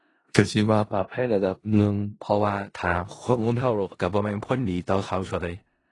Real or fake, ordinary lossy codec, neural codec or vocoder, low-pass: fake; AAC, 32 kbps; codec, 16 kHz in and 24 kHz out, 0.4 kbps, LongCat-Audio-Codec, four codebook decoder; 10.8 kHz